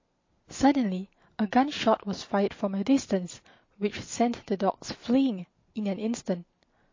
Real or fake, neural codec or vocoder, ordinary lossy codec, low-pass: real; none; MP3, 32 kbps; 7.2 kHz